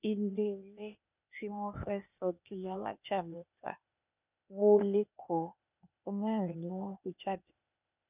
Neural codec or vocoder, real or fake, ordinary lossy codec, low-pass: codec, 16 kHz, 0.8 kbps, ZipCodec; fake; none; 3.6 kHz